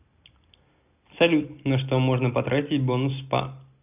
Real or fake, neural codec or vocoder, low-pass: real; none; 3.6 kHz